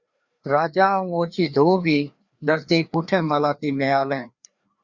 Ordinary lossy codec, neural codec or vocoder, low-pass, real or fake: Opus, 64 kbps; codec, 16 kHz, 2 kbps, FreqCodec, larger model; 7.2 kHz; fake